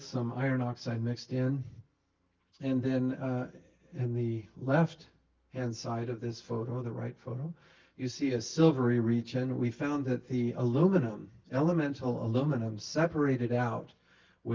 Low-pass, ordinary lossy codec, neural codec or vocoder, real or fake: 7.2 kHz; Opus, 24 kbps; none; real